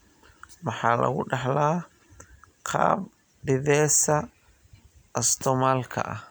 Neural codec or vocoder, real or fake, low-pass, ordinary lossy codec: none; real; none; none